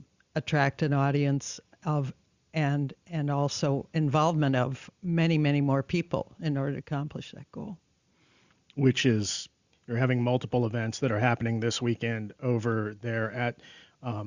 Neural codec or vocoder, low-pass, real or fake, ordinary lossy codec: none; 7.2 kHz; real; Opus, 64 kbps